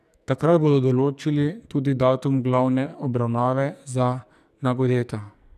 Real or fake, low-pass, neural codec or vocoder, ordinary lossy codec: fake; 14.4 kHz; codec, 44.1 kHz, 2.6 kbps, SNAC; none